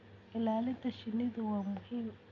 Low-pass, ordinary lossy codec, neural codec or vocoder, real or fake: 7.2 kHz; none; none; real